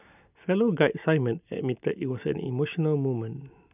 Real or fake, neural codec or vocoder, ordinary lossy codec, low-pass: real; none; none; 3.6 kHz